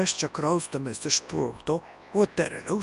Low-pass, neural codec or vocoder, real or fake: 10.8 kHz; codec, 24 kHz, 0.9 kbps, WavTokenizer, large speech release; fake